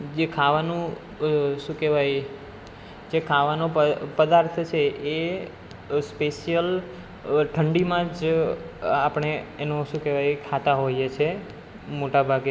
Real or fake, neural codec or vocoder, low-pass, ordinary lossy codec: real; none; none; none